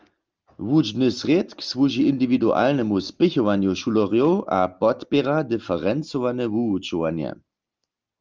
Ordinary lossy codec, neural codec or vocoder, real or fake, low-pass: Opus, 32 kbps; none; real; 7.2 kHz